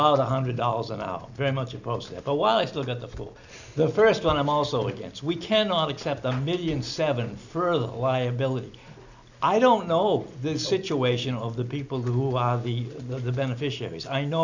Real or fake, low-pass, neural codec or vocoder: real; 7.2 kHz; none